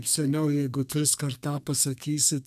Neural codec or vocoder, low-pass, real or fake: codec, 32 kHz, 1.9 kbps, SNAC; 14.4 kHz; fake